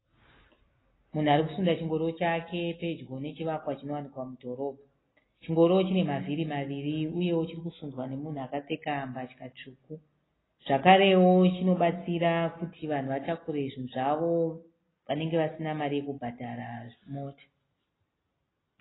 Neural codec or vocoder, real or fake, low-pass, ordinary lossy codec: none; real; 7.2 kHz; AAC, 16 kbps